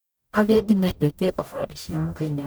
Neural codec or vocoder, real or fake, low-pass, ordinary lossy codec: codec, 44.1 kHz, 0.9 kbps, DAC; fake; none; none